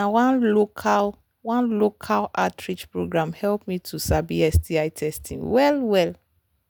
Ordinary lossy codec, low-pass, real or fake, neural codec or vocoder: none; none; real; none